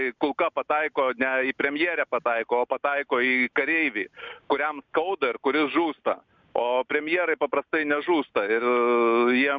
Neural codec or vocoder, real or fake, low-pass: none; real; 7.2 kHz